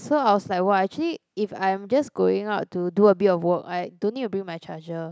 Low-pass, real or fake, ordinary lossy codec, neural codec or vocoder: none; real; none; none